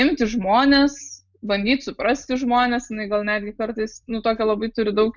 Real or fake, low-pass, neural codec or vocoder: real; 7.2 kHz; none